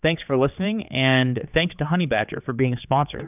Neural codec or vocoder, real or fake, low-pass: codec, 16 kHz, 4 kbps, X-Codec, HuBERT features, trained on general audio; fake; 3.6 kHz